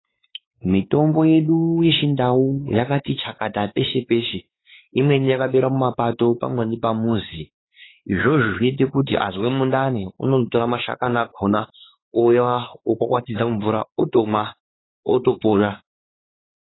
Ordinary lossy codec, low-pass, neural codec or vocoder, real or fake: AAC, 16 kbps; 7.2 kHz; codec, 16 kHz, 4 kbps, X-Codec, WavLM features, trained on Multilingual LibriSpeech; fake